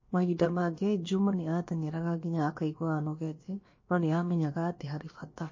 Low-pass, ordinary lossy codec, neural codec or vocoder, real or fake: 7.2 kHz; MP3, 32 kbps; codec, 16 kHz, about 1 kbps, DyCAST, with the encoder's durations; fake